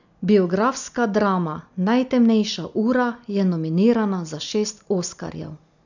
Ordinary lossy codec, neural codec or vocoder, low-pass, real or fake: none; none; 7.2 kHz; real